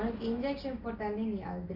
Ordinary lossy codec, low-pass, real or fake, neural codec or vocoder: none; 5.4 kHz; real; none